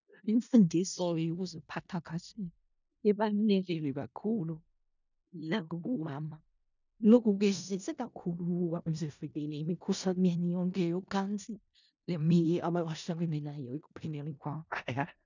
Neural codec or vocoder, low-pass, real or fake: codec, 16 kHz in and 24 kHz out, 0.4 kbps, LongCat-Audio-Codec, four codebook decoder; 7.2 kHz; fake